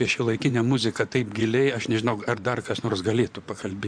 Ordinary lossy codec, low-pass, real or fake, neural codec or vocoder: AAC, 64 kbps; 9.9 kHz; fake; vocoder, 24 kHz, 100 mel bands, Vocos